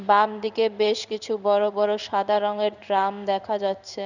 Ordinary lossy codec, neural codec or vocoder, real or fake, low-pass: none; codec, 16 kHz in and 24 kHz out, 1 kbps, XY-Tokenizer; fake; 7.2 kHz